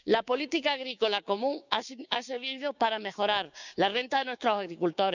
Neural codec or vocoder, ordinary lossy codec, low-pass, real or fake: codec, 16 kHz, 6 kbps, DAC; none; 7.2 kHz; fake